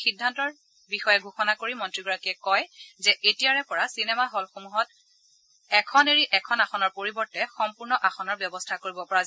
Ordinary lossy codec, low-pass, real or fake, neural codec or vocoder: none; none; real; none